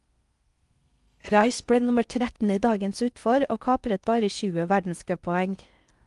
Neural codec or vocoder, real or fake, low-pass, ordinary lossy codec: codec, 16 kHz in and 24 kHz out, 0.6 kbps, FocalCodec, streaming, 4096 codes; fake; 10.8 kHz; Opus, 32 kbps